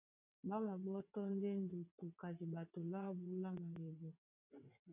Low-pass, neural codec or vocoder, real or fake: 3.6 kHz; vocoder, 22.05 kHz, 80 mel bands, WaveNeXt; fake